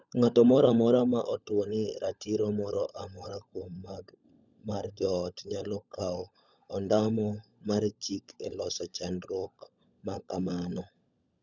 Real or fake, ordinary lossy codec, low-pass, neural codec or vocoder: fake; none; 7.2 kHz; codec, 16 kHz, 16 kbps, FunCodec, trained on LibriTTS, 50 frames a second